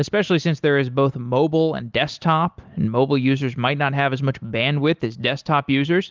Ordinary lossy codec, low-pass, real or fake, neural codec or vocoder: Opus, 24 kbps; 7.2 kHz; real; none